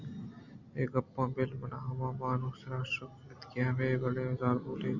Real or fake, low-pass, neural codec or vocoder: real; 7.2 kHz; none